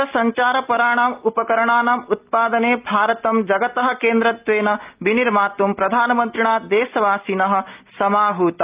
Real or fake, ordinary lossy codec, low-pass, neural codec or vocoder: real; Opus, 32 kbps; 3.6 kHz; none